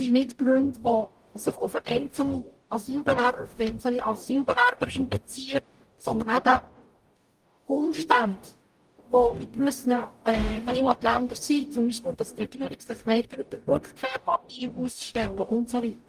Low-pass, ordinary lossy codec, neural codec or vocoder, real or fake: 14.4 kHz; Opus, 32 kbps; codec, 44.1 kHz, 0.9 kbps, DAC; fake